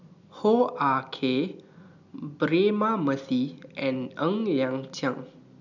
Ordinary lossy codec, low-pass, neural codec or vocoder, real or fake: none; 7.2 kHz; none; real